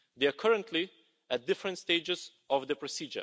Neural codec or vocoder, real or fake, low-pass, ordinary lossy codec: none; real; none; none